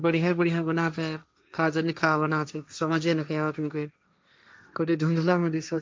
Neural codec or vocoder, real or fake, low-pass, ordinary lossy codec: codec, 16 kHz, 1.1 kbps, Voila-Tokenizer; fake; none; none